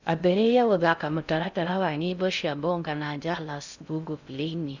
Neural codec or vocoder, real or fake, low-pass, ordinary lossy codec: codec, 16 kHz in and 24 kHz out, 0.6 kbps, FocalCodec, streaming, 2048 codes; fake; 7.2 kHz; none